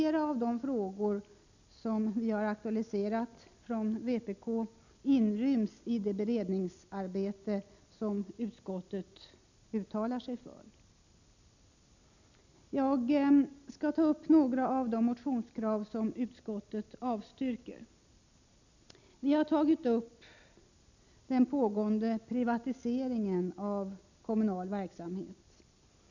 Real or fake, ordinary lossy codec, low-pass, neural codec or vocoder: real; none; 7.2 kHz; none